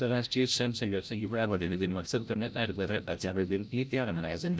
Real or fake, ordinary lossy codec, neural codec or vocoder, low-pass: fake; none; codec, 16 kHz, 0.5 kbps, FreqCodec, larger model; none